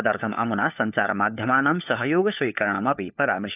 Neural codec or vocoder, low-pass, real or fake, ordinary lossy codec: codec, 16 kHz, 4 kbps, FunCodec, trained on LibriTTS, 50 frames a second; 3.6 kHz; fake; none